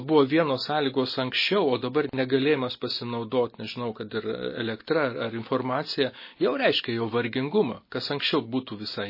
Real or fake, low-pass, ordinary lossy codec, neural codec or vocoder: real; 5.4 kHz; MP3, 24 kbps; none